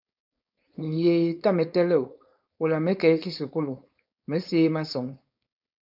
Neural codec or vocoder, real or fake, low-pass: codec, 16 kHz, 4.8 kbps, FACodec; fake; 5.4 kHz